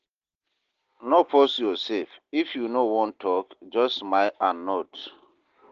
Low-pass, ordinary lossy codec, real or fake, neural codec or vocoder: 7.2 kHz; Opus, 16 kbps; real; none